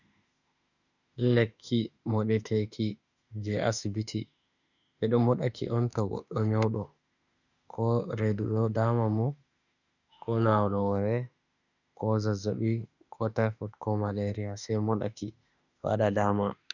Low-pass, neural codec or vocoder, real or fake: 7.2 kHz; autoencoder, 48 kHz, 32 numbers a frame, DAC-VAE, trained on Japanese speech; fake